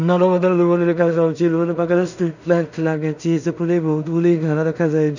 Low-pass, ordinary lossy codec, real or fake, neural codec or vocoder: 7.2 kHz; none; fake; codec, 16 kHz in and 24 kHz out, 0.4 kbps, LongCat-Audio-Codec, two codebook decoder